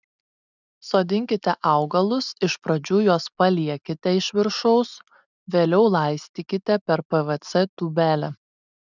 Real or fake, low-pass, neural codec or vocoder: real; 7.2 kHz; none